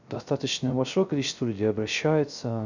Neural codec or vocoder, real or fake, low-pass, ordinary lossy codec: codec, 16 kHz, 0.3 kbps, FocalCodec; fake; 7.2 kHz; none